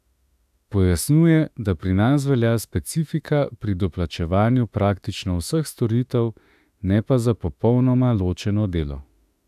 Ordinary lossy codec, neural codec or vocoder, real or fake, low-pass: none; autoencoder, 48 kHz, 32 numbers a frame, DAC-VAE, trained on Japanese speech; fake; 14.4 kHz